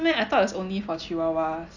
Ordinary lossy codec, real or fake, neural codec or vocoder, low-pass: none; real; none; 7.2 kHz